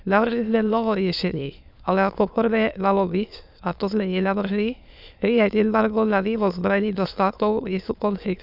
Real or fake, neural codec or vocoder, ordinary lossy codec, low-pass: fake; autoencoder, 22.05 kHz, a latent of 192 numbers a frame, VITS, trained on many speakers; none; 5.4 kHz